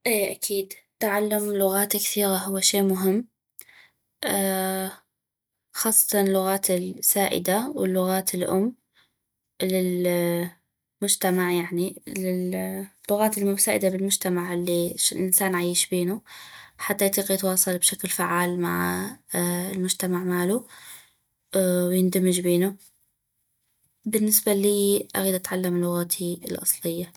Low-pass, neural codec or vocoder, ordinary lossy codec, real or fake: none; none; none; real